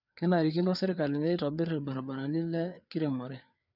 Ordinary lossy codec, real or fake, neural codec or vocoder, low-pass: none; fake; codec, 16 kHz, 4 kbps, FreqCodec, larger model; 5.4 kHz